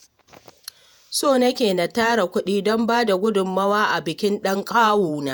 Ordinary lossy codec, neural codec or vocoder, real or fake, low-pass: none; vocoder, 48 kHz, 128 mel bands, Vocos; fake; none